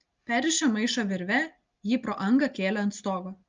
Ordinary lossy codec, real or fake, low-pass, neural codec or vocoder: Opus, 24 kbps; real; 7.2 kHz; none